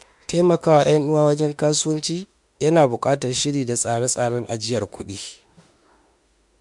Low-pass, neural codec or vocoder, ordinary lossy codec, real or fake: 10.8 kHz; autoencoder, 48 kHz, 32 numbers a frame, DAC-VAE, trained on Japanese speech; MP3, 64 kbps; fake